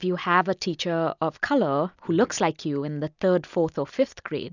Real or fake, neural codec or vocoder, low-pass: real; none; 7.2 kHz